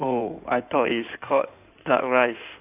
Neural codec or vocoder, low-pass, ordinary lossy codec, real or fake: codec, 16 kHz in and 24 kHz out, 2.2 kbps, FireRedTTS-2 codec; 3.6 kHz; none; fake